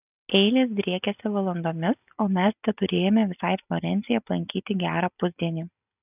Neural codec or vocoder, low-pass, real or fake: none; 3.6 kHz; real